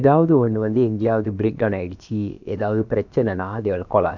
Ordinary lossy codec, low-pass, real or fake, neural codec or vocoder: none; 7.2 kHz; fake; codec, 16 kHz, about 1 kbps, DyCAST, with the encoder's durations